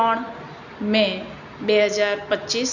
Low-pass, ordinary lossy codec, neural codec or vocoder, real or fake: 7.2 kHz; none; none; real